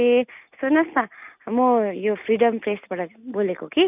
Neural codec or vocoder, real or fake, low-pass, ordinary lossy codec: none; real; 3.6 kHz; none